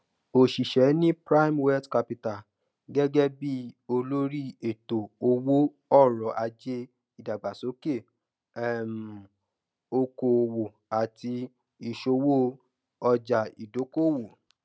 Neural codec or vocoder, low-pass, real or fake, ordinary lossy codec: none; none; real; none